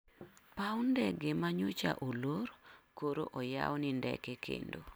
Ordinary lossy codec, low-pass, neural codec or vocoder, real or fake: none; none; none; real